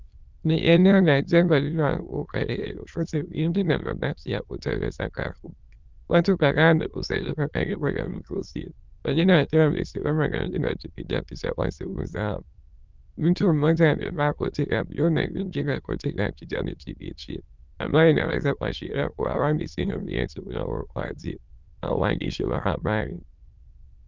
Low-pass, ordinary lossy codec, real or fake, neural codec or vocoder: 7.2 kHz; Opus, 32 kbps; fake; autoencoder, 22.05 kHz, a latent of 192 numbers a frame, VITS, trained on many speakers